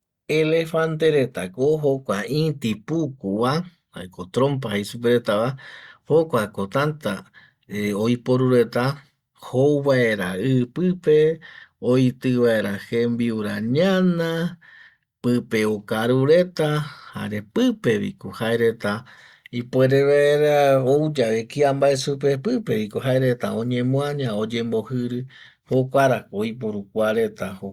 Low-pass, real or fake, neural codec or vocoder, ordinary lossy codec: 19.8 kHz; real; none; Opus, 64 kbps